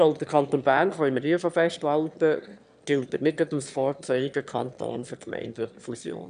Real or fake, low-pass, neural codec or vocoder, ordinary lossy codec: fake; 9.9 kHz; autoencoder, 22.05 kHz, a latent of 192 numbers a frame, VITS, trained on one speaker; none